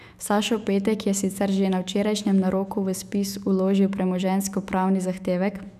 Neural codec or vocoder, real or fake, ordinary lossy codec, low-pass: autoencoder, 48 kHz, 128 numbers a frame, DAC-VAE, trained on Japanese speech; fake; none; 14.4 kHz